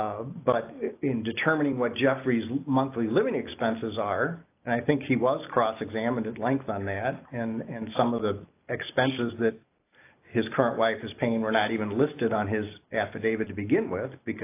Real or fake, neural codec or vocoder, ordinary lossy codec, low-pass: real; none; AAC, 24 kbps; 3.6 kHz